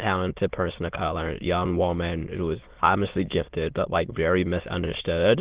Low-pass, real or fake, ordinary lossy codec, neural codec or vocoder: 3.6 kHz; fake; Opus, 16 kbps; autoencoder, 22.05 kHz, a latent of 192 numbers a frame, VITS, trained on many speakers